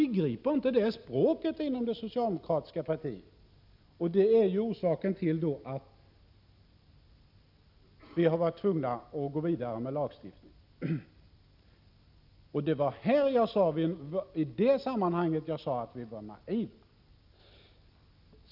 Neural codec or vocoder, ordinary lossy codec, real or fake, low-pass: none; none; real; 5.4 kHz